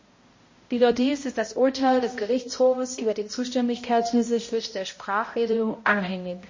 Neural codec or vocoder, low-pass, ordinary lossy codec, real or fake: codec, 16 kHz, 0.5 kbps, X-Codec, HuBERT features, trained on balanced general audio; 7.2 kHz; MP3, 32 kbps; fake